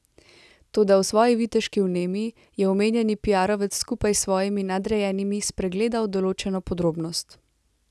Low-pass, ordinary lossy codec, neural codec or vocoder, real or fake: none; none; none; real